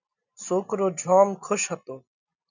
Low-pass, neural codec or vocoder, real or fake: 7.2 kHz; none; real